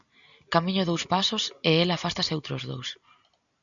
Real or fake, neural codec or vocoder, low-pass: real; none; 7.2 kHz